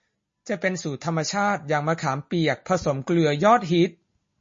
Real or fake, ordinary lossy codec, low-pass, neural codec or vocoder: real; MP3, 32 kbps; 7.2 kHz; none